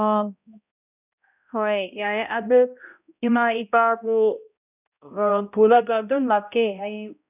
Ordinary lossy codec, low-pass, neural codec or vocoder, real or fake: none; 3.6 kHz; codec, 16 kHz, 0.5 kbps, X-Codec, HuBERT features, trained on balanced general audio; fake